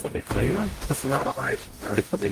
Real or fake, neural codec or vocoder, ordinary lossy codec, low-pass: fake; codec, 44.1 kHz, 0.9 kbps, DAC; Opus, 32 kbps; 14.4 kHz